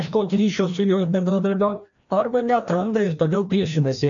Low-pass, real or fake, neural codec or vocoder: 7.2 kHz; fake; codec, 16 kHz, 1 kbps, FreqCodec, larger model